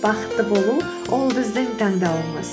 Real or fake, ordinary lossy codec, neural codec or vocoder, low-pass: real; none; none; none